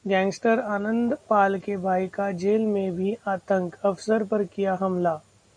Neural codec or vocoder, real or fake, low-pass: none; real; 9.9 kHz